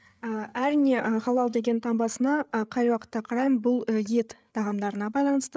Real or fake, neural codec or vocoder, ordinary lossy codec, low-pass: fake; codec, 16 kHz, 8 kbps, FreqCodec, larger model; none; none